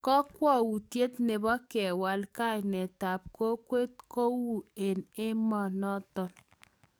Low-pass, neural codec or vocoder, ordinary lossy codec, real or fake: none; codec, 44.1 kHz, 7.8 kbps, DAC; none; fake